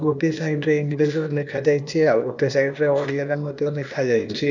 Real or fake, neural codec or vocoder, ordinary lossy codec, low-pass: fake; codec, 16 kHz, 0.8 kbps, ZipCodec; none; 7.2 kHz